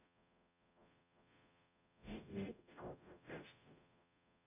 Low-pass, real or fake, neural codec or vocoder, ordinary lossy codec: 3.6 kHz; fake; codec, 44.1 kHz, 0.9 kbps, DAC; none